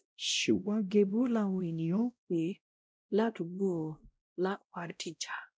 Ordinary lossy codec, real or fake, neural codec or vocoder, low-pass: none; fake; codec, 16 kHz, 0.5 kbps, X-Codec, WavLM features, trained on Multilingual LibriSpeech; none